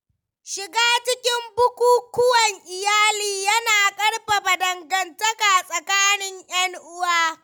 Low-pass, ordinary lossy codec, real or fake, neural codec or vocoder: 19.8 kHz; none; real; none